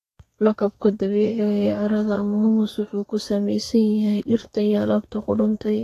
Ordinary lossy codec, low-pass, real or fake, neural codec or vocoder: AAC, 48 kbps; 14.4 kHz; fake; codec, 32 kHz, 1.9 kbps, SNAC